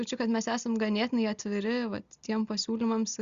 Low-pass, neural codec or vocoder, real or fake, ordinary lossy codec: 7.2 kHz; none; real; Opus, 64 kbps